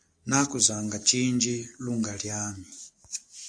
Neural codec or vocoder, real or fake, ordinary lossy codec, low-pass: none; real; AAC, 64 kbps; 9.9 kHz